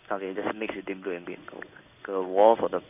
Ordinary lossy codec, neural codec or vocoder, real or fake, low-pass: none; codec, 16 kHz in and 24 kHz out, 1 kbps, XY-Tokenizer; fake; 3.6 kHz